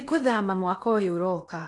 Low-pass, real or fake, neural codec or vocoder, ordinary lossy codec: 10.8 kHz; fake; codec, 16 kHz in and 24 kHz out, 0.8 kbps, FocalCodec, streaming, 65536 codes; AAC, 48 kbps